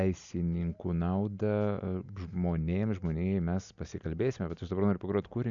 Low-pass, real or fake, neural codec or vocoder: 7.2 kHz; real; none